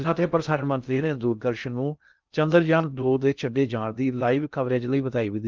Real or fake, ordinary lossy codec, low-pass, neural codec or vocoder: fake; Opus, 24 kbps; 7.2 kHz; codec, 16 kHz in and 24 kHz out, 0.6 kbps, FocalCodec, streaming, 2048 codes